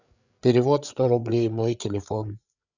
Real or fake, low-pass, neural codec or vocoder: fake; 7.2 kHz; codec, 16 kHz, 8 kbps, FreqCodec, larger model